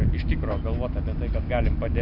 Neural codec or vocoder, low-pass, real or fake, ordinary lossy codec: none; 5.4 kHz; real; AAC, 48 kbps